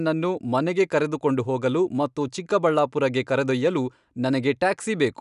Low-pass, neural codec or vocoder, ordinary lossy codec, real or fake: 10.8 kHz; none; none; real